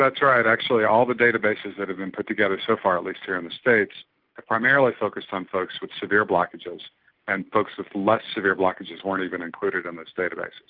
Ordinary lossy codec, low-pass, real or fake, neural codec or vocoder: Opus, 24 kbps; 5.4 kHz; real; none